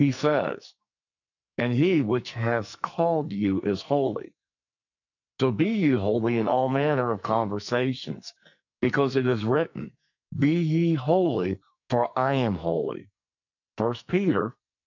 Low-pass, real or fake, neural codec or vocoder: 7.2 kHz; fake; codec, 44.1 kHz, 2.6 kbps, SNAC